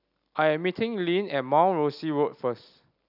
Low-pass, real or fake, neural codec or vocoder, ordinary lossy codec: 5.4 kHz; real; none; none